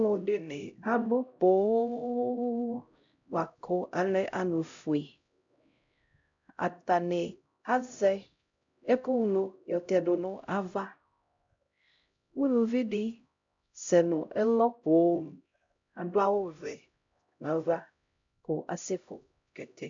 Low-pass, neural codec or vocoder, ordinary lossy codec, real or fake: 7.2 kHz; codec, 16 kHz, 0.5 kbps, X-Codec, HuBERT features, trained on LibriSpeech; MP3, 64 kbps; fake